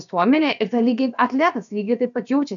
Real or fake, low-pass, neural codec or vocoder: fake; 7.2 kHz; codec, 16 kHz, about 1 kbps, DyCAST, with the encoder's durations